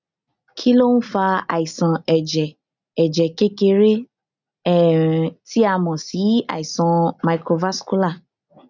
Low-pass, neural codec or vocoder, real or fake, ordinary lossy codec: 7.2 kHz; none; real; none